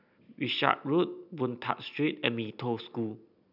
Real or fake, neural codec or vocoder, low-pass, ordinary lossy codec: real; none; 5.4 kHz; none